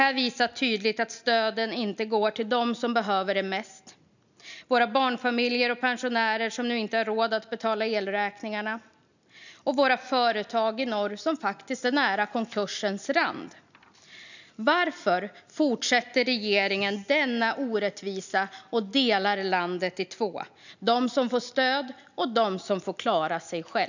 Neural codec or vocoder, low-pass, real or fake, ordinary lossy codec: none; 7.2 kHz; real; none